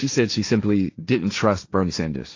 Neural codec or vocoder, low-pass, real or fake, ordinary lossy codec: codec, 16 kHz, 1.1 kbps, Voila-Tokenizer; 7.2 kHz; fake; AAC, 48 kbps